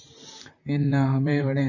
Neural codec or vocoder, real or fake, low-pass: vocoder, 44.1 kHz, 128 mel bands, Pupu-Vocoder; fake; 7.2 kHz